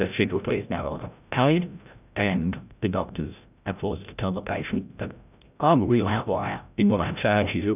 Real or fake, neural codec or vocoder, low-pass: fake; codec, 16 kHz, 0.5 kbps, FreqCodec, larger model; 3.6 kHz